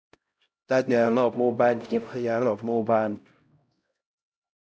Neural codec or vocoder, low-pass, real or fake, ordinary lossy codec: codec, 16 kHz, 0.5 kbps, X-Codec, HuBERT features, trained on LibriSpeech; none; fake; none